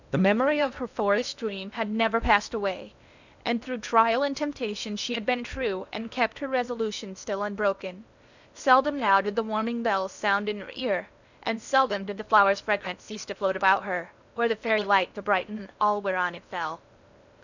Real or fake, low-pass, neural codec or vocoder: fake; 7.2 kHz; codec, 16 kHz in and 24 kHz out, 0.8 kbps, FocalCodec, streaming, 65536 codes